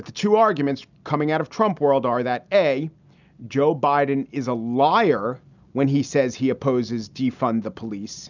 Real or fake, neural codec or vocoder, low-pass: real; none; 7.2 kHz